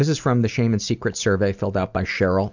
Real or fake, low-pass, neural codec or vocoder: real; 7.2 kHz; none